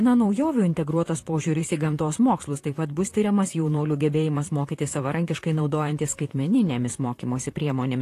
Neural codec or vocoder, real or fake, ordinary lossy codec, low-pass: vocoder, 44.1 kHz, 128 mel bands, Pupu-Vocoder; fake; AAC, 48 kbps; 14.4 kHz